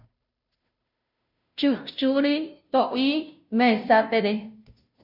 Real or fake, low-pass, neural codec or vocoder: fake; 5.4 kHz; codec, 16 kHz, 0.5 kbps, FunCodec, trained on Chinese and English, 25 frames a second